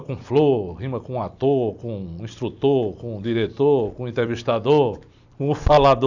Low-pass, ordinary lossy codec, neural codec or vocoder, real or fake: 7.2 kHz; none; vocoder, 44.1 kHz, 128 mel bands every 256 samples, BigVGAN v2; fake